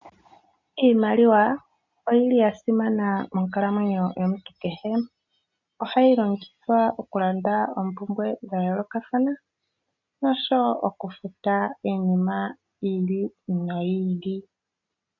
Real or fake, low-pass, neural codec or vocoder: real; 7.2 kHz; none